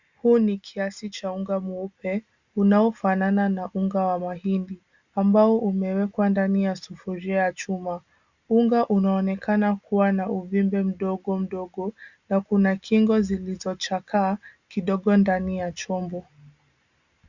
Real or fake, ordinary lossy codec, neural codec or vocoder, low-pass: real; Opus, 64 kbps; none; 7.2 kHz